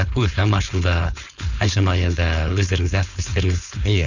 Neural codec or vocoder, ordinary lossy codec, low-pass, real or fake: codec, 16 kHz, 4.8 kbps, FACodec; none; 7.2 kHz; fake